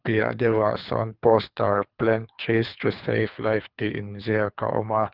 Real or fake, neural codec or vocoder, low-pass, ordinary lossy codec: fake; codec, 24 kHz, 3 kbps, HILCodec; 5.4 kHz; Opus, 24 kbps